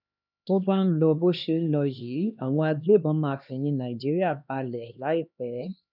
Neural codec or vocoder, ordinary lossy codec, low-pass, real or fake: codec, 16 kHz, 2 kbps, X-Codec, HuBERT features, trained on LibriSpeech; none; 5.4 kHz; fake